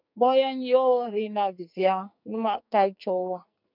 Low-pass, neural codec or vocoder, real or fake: 5.4 kHz; codec, 44.1 kHz, 2.6 kbps, SNAC; fake